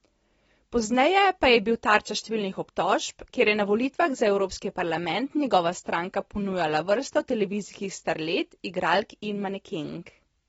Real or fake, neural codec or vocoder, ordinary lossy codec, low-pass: real; none; AAC, 24 kbps; 10.8 kHz